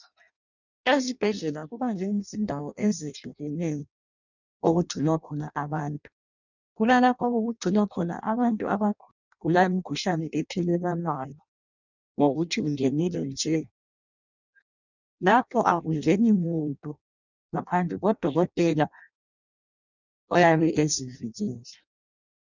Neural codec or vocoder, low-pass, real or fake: codec, 16 kHz in and 24 kHz out, 0.6 kbps, FireRedTTS-2 codec; 7.2 kHz; fake